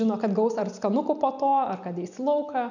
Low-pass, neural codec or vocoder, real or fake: 7.2 kHz; none; real